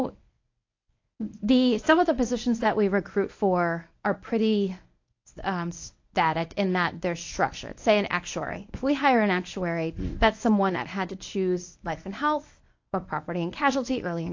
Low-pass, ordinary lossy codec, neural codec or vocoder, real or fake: 7.2 kHz; AAC, 48 kbps; codec, 24 kHz, 0.9 kbps, WavTokenizer, medium speech release version 1; fake